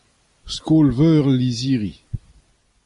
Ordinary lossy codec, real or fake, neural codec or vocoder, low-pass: MP3, 64 kbps; real; none; 10.8 kHz